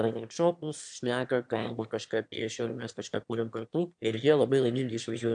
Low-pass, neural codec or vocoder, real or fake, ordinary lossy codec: 9.9 kHz; autoencoder, 22.05 kHz, a latent of 192 numbers a frame, VITS, trained on one speaker; fake; AAC, 64 kbps